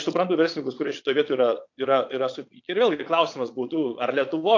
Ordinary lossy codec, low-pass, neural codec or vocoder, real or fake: AAC, 48 kbps; 7.2 kHz; vocoder, 22.05 kHz, 80 mel bands, Vocos; fake